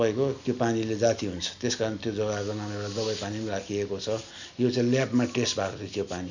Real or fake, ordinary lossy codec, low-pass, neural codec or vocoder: real; none; 7.2 kHz; none